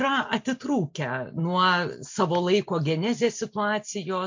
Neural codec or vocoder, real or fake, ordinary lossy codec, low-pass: none; real; MP3, 48 kbps; 7.2 kHz